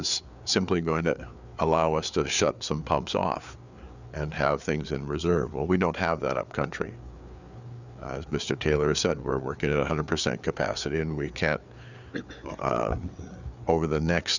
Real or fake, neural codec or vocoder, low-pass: fake; codec, 16 kHz, 4 kbps, FreqCodec, larger model; 7.2 kHz